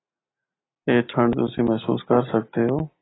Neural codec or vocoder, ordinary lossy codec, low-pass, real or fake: none; AAC, 16 kbps; 7.2 kHz; real